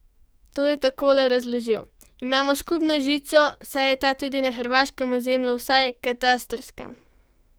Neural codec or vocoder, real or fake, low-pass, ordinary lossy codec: codec, 44.1 kHz, 2.6 kbps, SNAC; fake; none; none